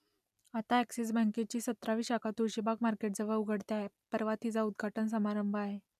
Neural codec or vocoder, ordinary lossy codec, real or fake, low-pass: none; none; real; 14.4 kHz